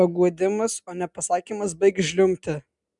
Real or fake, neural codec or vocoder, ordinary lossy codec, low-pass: real; none; MP3, 96 kbps; 10.8 kHz